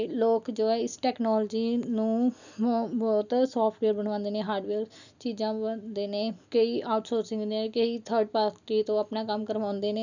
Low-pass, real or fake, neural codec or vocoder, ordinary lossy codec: 7.2 kHz; real; none; none